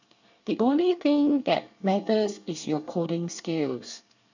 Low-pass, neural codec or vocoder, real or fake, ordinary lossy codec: 7.2 kHz; codec, 24 kHz, 1 kbps, SNAC; fake; none